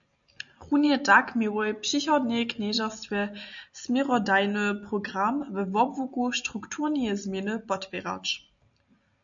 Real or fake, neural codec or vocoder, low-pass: real; none; 7.2 kHz